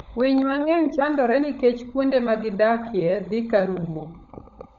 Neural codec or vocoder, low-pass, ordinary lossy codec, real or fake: codec, 16 kHz, 16 kbps, FunCodec, trained on LibriTTS, 50 frames a second; 7.2 kHz; none; fake